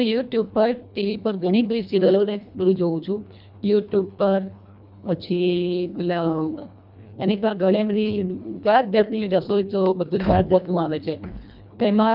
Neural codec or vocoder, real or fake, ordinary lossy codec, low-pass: codec, 24 kHz, 1.5 kbps, HILCodec; fake; none; 5.4 kHz